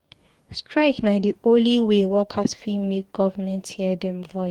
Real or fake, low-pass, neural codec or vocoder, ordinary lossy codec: fake; 19.8 kHz; codec, 44.1 kHz, 2.6 kbps, DAC; Opus, 24 kbps